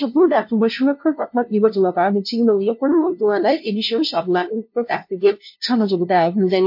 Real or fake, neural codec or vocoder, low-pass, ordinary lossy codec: fake; codec, 16 kHz, 0.5 kbps, FunCodec, trained on LibriTTS, 25 frames a second; 5.4 kHz; MP3, 32 kbps